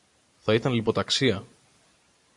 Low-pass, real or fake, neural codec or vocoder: 10.8 kHz; real; none